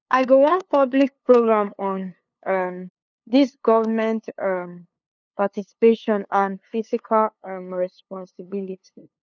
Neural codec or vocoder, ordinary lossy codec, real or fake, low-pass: codec, 16 kHz, 2 kbps, FunCodec, trained on LibriTTS, 25 frames a second; none; fake; 7.2 kHz